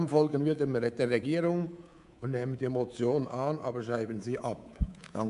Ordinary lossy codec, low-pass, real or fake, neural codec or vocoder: AAC, 96 kbps; 10.8 kHz; fake; codec, 24 kHz, 3.1 kbps, DualCodec